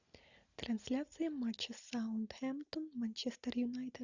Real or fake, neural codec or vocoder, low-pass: real; none; 7.2 kHz